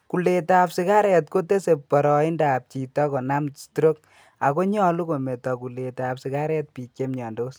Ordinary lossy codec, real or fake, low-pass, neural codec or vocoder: none; real; none; none